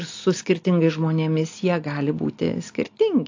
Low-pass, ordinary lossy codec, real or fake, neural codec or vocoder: 7.2 kHz; AAC, 48 kbps; real; none